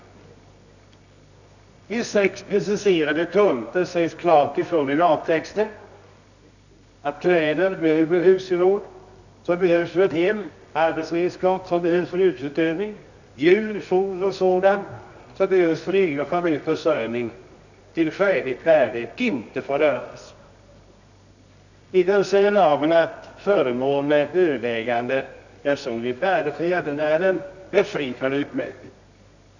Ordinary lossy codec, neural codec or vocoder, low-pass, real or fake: none; codec, 24 kHz, 0.9 kbps, WavTokenizer, medium music audio release; 7.2 kHz; fake